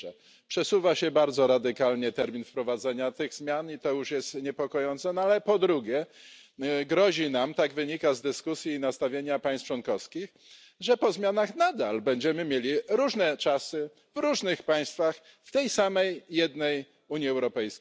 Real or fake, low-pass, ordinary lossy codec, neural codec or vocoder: real; none; none; none